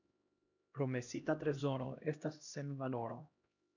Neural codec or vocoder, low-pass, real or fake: codec, 16 kHz, 1 kbps, X-Codec, HuBERT features, trained on LibriSpeech; 7.2 kHz; fake